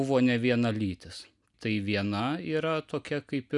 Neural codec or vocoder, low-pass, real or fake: none; 10.8 kHz; real